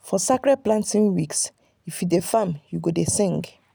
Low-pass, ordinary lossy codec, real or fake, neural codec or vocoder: none; none; real; none